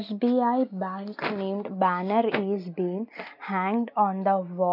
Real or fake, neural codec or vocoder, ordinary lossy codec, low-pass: real; none; none; 5.4 kHz